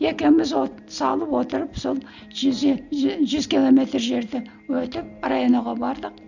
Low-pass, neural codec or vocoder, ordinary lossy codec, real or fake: 7.2 kHz; none; none; real